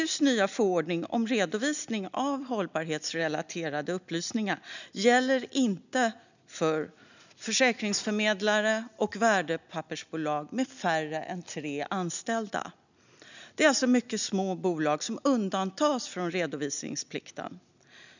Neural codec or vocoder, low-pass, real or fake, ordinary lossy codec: none; 7.2 kHz; real; none